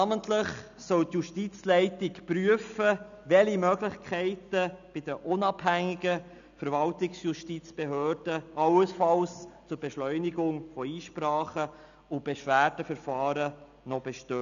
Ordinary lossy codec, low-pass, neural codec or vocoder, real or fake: none; 7.2 kHz; none; real